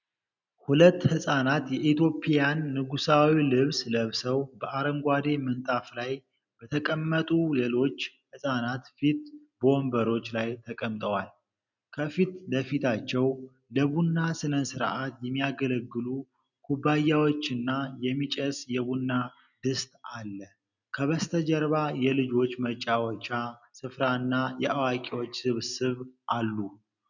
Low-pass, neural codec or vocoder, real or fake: 7.2 kHz; none; real